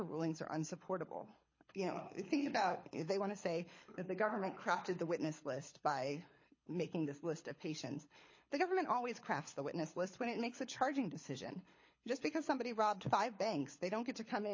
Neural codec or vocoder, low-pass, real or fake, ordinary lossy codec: codec, 24 kHz, 6 kbps, HILCodec; 7.2 kHz; fake; MP3, 32 kbps